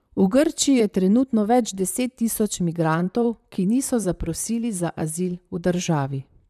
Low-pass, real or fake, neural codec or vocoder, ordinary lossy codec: 14.4 kHz; fake; vocoder, 44.1 kHz, 128 mel bands, Pupu-Vocoder; none